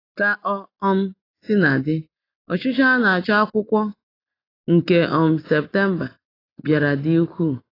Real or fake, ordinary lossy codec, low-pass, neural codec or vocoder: real; AAC, 24 kbps; 5.4 kHz; none